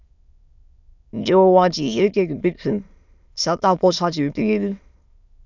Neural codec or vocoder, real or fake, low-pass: autoencoder, 22.05 kHz, a latent of 192 numbers a frame, VITS, trained on many speakers; fake; 7.2 kHz